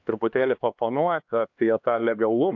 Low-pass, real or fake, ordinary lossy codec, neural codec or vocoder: 7.2 kHz; fake; AAC, 48 kbps; codec, 16 kHz, 2 kbps, X-Codec, HuBERT features, trained on LibriSpeech